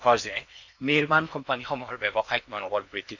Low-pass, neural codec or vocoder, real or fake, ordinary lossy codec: 7.2 kHz; codec, 16 kHz in and 24 kHz out, 0.8 kbps, FocalCodec, streaming, 65536 codes; fake; none